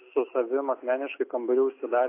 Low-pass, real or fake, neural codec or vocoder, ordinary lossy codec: 3.6 kHz; real; none; AAC, 24 kbps